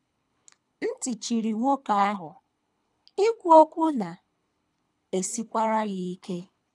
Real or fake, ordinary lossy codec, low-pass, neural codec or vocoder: fake; none; none; codec, 24 kHz, 3 kbps, HILCodec